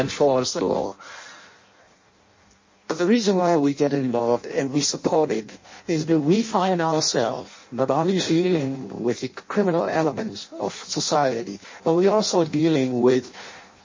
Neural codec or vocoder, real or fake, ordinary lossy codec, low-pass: codec, 16 kHz in and 24 kHz out, 0.6 kbps, FireRedTTS-2 codec; fake; MP3, 32 kbps; 7.2 kHz